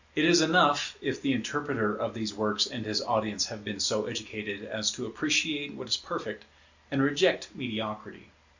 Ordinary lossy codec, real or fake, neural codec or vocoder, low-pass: Opus, 64 kbps; real; none; 7.2 kHz